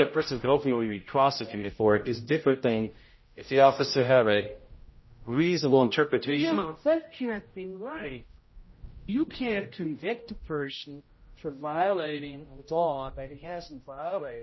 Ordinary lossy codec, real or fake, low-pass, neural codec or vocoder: MP3, 24 kbps; fake; 7.2 kHz; codec, 16 kHz, 0.5 kbps, X-Codec, HuBERT features, trained on general audio